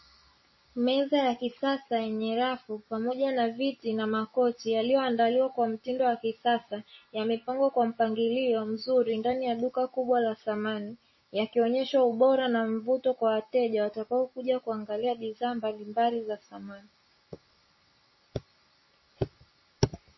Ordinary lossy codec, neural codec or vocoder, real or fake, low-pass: MP3, 24 kbps; none; real; 7.2 kHz